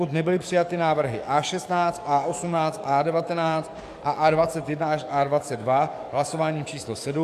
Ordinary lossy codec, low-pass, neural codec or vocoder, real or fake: MP3, 96 kbps; 14.4 kHz; codec, 44.1 kHz, 7.8 kbps, DAC; fake